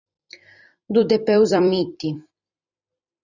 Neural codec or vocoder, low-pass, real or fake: vocoder, 44.1 kHz, 128 mel bands every 256 samples, BigVGAN v2; 7.2 kHz; fake